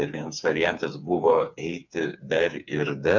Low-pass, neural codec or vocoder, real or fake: 7.2 kHz; codec, 16 kHz, 4 kbps, FreqCodec, smaller model; fake